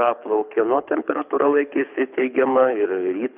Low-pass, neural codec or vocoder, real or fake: 3.6 kHz; codec, 24 kHz, 6 kbps, HILCodec; fake